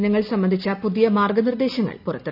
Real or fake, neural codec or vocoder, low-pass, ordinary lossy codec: real; none; 5.4 kHz; none